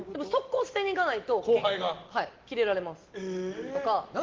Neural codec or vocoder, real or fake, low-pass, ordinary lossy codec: none; real; 7.2 kHz; Opus, 16 kbps